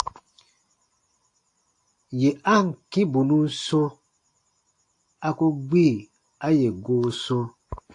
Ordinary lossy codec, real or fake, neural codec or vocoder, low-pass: AAC, 48 kbps; real; none; 10.8 kHz